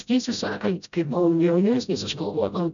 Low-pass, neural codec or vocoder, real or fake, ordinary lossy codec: 7.2 kHz; codec, 16 kHz, 0.5 kbps, FreqCodec, smaller model; fake; MP3, 96 kbps